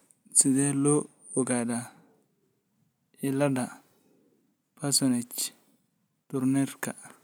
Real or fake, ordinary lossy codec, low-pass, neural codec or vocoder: real; none; none; none